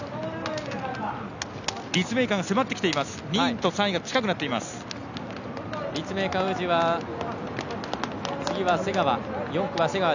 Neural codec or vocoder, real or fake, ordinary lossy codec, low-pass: none; real; none; 7.2 kHz